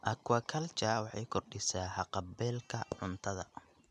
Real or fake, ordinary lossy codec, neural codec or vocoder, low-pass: real; none; none; none